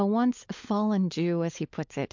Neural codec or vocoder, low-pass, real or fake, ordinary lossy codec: codec, 16 kHz, 16 kbps, FunCodec, trained on LibriTTS, 50 frames a second; 7.2 kHz; fake; MP3, 64 kbps